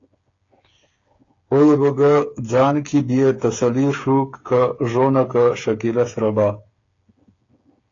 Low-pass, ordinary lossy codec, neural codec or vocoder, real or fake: 7.2 kHz; AAC, 32 kbps; codec, 16 kHz, 8 kbps, FreqCodec, smaller model; fake